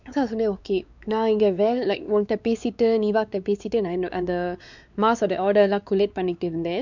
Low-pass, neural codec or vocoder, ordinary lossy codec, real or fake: 7.2 kHz; codec, 16 kHz, 4 kbps, X-Codec, WavLM features, trained on Multilingual LibriSpeech; none; fake